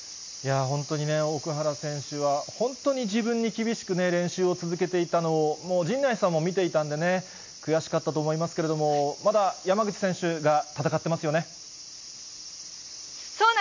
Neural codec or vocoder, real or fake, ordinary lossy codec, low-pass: none; real; MP3, 64 kbps; 7.2 kHz